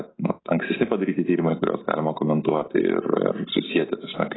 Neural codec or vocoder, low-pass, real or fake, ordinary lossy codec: none; 7.2 kHz; real; AAC, 16 kbps